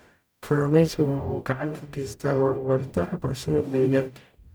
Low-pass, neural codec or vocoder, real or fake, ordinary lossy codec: none; codec, 44.1 kHz, 0.9 kbps, DAC; fake; none